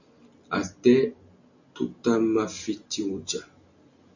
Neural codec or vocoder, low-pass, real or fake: none; 7.2 kHz; real